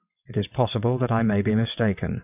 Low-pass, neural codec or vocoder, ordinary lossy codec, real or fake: 3.6 kHz; vocoder, 22.05 kHz, 80 mel bands, WaveNeXt; AAC, 32 kbps; fake